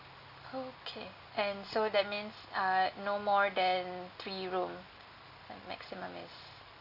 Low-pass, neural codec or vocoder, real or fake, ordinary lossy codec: 5.4 kHz; none; real; Opus, 64 kbps